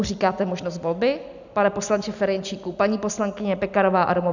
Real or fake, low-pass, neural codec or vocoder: real; 7.2 kHz; none